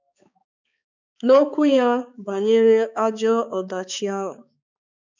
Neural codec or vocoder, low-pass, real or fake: codec, 16 kHz, 4 kbps, X-Codec, HuBERT features, trained on balanced general audio; 7.2 kHz; fake